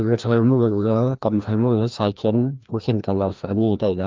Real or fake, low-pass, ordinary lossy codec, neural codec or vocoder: fake; 7.2 kHz; Opus, 32 kbps; codec, 16 kHz, 1 kbps, FreqCodec, larger model